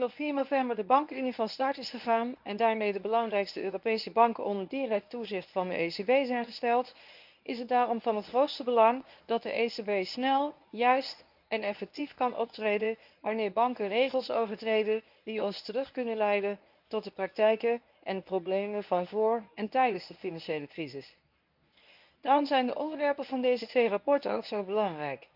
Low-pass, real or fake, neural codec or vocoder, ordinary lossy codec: 5.4 kHz; fake; codec, 24 kHz, 0.9 kbps, WavTokenizer, medium speech release version 1; none